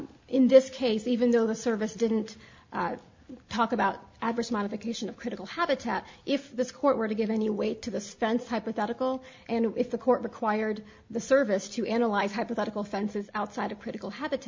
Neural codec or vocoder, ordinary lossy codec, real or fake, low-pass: none; MP3, 48 kbps; real; 7.2 kHz